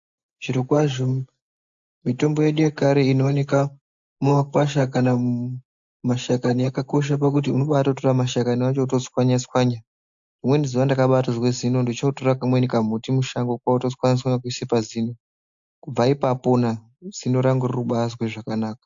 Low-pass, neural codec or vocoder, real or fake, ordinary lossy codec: 7.2 kHz; none; real; AAC, 64 kbps